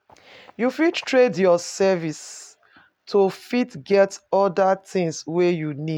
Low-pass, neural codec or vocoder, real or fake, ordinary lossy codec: 19.8 kHz; none; real; none